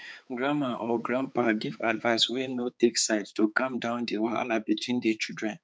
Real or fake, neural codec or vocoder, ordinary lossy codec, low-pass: fake; codec, 16 kHz, 4 kbps, X-Codec, HuBERT features, trained on balanced general audio; none; none